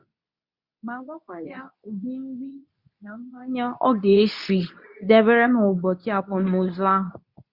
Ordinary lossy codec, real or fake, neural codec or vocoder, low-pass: Opus, 64 kbps; fake; codec, 24 kHz, 0.9 kbps, WavTokenizer, medium speech release version 2; 5.4 kHz